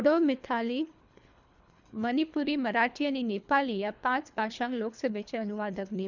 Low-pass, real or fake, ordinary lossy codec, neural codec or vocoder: 7.2 kHz; fake; none; codec, 24 kHz, 3 kbps, HILCodec